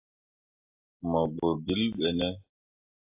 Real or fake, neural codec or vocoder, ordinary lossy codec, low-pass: real; none; AAC, 32 kbps; 3.6 kHz